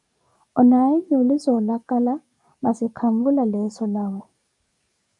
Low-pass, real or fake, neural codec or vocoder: 10.8 kHz; fake; codec, 44.1 kHz, 7.8 kbps, DAC